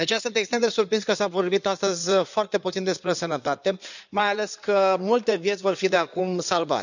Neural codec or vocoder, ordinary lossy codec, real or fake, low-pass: codec, 16 kHz in and 24 kHz out, 2.2 kbps, FireRedTTS-2 codec; none; fake; 7.2 kHz